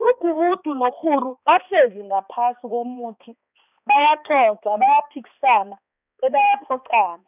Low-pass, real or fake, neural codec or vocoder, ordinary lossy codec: 3.6 kHz; fake; codec, 16 kHz, 2 kbps, X-Codec, HuBERT features, trained on balanced general audio; none